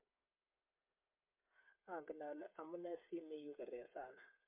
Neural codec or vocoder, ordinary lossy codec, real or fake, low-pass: codec, 16 kHz, 8 kbps, FreqCodec, smaller model; MP3, 32 kbps; fake; 3.6 kHz